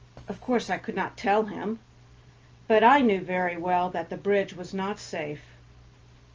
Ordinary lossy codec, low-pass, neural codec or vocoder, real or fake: Opus, 24 kbps; 7.2 kHz; none; real